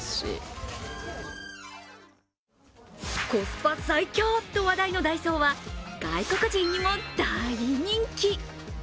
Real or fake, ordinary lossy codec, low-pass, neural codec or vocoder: real; none; none; none